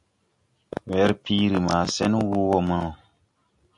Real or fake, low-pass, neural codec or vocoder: real; 10.8 kHz; none